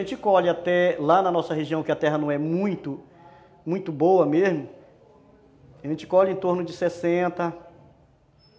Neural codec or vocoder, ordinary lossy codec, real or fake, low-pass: none; none; real; none